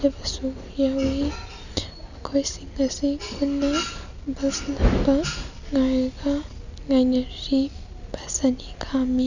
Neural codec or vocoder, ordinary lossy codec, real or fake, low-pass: none; none; real; 7.2 kHz